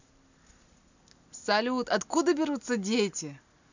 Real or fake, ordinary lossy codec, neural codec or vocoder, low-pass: real; none; none; 7.2 kHz